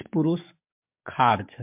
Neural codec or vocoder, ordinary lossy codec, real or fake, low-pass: codec, 44.1 kHz, 7.8 kbps, DAC; MP3, 32 kbps; fake; 3.6 kHz